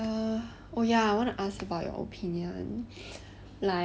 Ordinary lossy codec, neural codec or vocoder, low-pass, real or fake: none; none; none; real